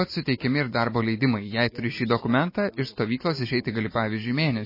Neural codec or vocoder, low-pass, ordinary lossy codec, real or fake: none; 5.4 kHz; MP3, 24 kbps; real